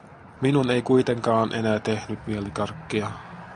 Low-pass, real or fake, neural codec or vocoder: 10.8 kHz; real; none